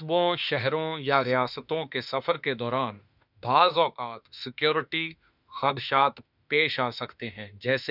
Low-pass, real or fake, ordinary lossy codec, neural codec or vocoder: 5.4 kHz; fake; none; autoencoder, 48 kHz, 32 numbers a frame, DAC-VAE, trained on Japanese speech